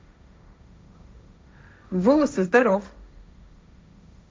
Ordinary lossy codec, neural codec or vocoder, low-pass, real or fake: none; codec, 16 kHz, 1.1 kbps, Voila-Tokenizer; none; fake